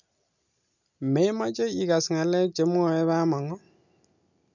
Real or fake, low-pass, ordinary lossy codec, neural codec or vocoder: real; 7.2 kHz; none; none